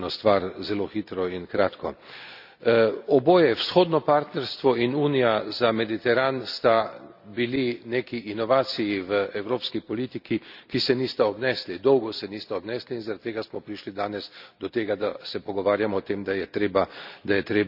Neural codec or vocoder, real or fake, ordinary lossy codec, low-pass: none; real; none; 5.4 kHz